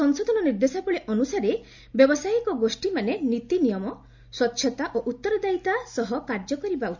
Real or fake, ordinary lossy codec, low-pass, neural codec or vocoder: real; none; 7.2 kHz; none